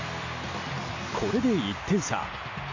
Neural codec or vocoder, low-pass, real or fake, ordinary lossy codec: none; 7.2 kHz; real; AAC, 32 kbps